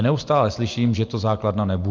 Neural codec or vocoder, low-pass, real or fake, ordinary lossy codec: none; 7.2 kHz; real; Opus, 24 kbps